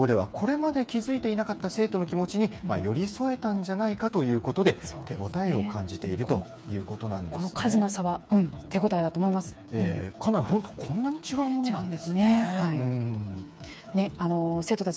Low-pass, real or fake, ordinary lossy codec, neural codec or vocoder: none; fake; none; codec, 16 kHz, 4 kbps, FreqCodec, smaller model